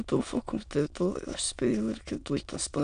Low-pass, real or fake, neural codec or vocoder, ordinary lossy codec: 9.9 kHz; fake; autoencoder, 22.05 kHz, a latent of 192 numbers a frame, VITS, trained on many speakers; MP3, 96 kbps